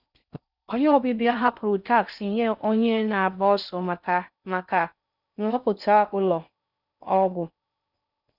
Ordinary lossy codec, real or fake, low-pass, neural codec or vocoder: none; fake; 5.4 kHz; codec, 16 kHz in and 24 kHz out, 0.6 kbps, FocalCodec, streaming, 4096 codes